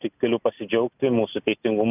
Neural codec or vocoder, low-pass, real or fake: none; 3.6 kHz; real